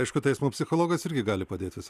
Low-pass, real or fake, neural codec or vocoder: 14.4 kHz; real; none